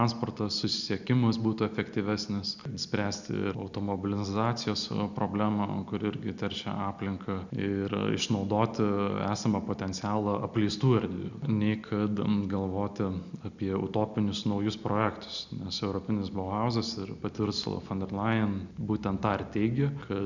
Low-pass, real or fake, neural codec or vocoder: 7.2 kHz; real; none